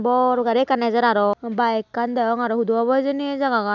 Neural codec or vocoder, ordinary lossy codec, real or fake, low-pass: none; none; real; 7.2 kHz